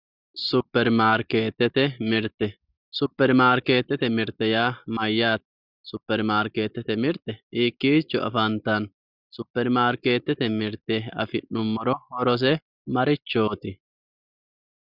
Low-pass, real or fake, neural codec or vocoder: 5.4 kHz; real; none